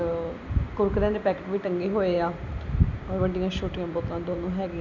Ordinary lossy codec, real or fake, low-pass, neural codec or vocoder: none; real; 7.2 kHz; none